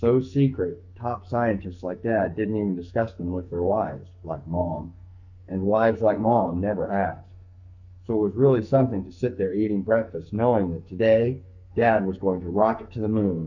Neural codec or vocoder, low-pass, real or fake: codec, 44.1 kHz, 2.6 kbps, SNAC; 7.2 kHz; fake